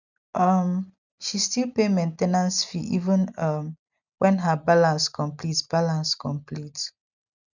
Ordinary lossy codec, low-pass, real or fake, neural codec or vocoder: none; 7.2 kHz; real; none